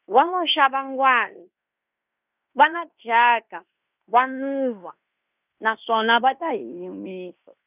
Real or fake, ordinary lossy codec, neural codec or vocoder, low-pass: fake; none; codec, 16 kHz in and 24 kHz out, 0.9 kbps, LongCat-Audio-Codec, fine tuned four codebook decoder; 3.6 kHz